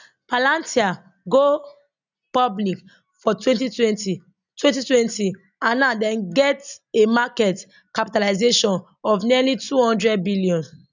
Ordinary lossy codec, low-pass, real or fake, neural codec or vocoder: none; 7.2 kHz; real; none